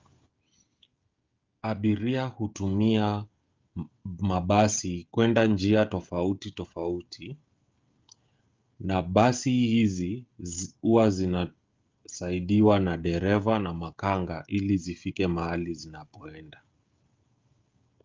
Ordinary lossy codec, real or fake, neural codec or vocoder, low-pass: Opus, 24 kbps; fake; codec, 16 kHz, 16 kbps, FreqCodec, smaller model; 7.2 kHz